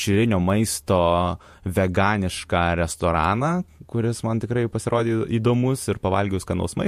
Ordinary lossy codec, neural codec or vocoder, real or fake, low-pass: MP3, 64 kbps; vocoder, 48 kHz, 128 mel bands, Vocos; fake; 14.4 kHz